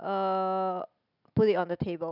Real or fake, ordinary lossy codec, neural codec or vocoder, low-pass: real; none; none; 5.4 kHz